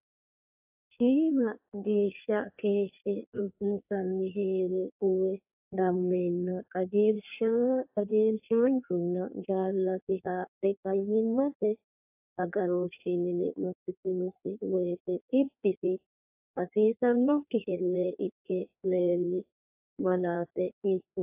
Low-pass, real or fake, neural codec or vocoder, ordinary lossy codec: 3.6 kHz; fake; codec, 16 kHz in and 24 kHz out, 1.1 kbps, FireRedTTS-2 codec; AAC, 32 kbps